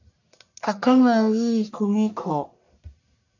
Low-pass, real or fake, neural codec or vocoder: 7.2 kHz; fake; codec, 44.1 kHz, 1.7 kbps, Pupu-Codec